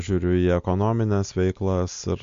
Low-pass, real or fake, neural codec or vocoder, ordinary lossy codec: 7.2 kHz; real; none; MP3, 48 kbps